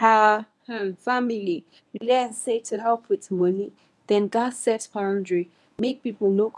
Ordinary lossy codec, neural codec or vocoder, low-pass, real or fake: none; codec, 24 kHz, 0.9 kbps, WavTokenizer, medium speech release version 1; none; fake